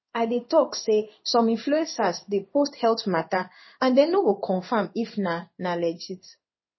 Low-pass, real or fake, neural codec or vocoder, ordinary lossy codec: 7.2 kHz; fake; codec, 16 kHz in and 24 kHz out, 1 kbps, XY-Tokenizer; MP3, 24 kbps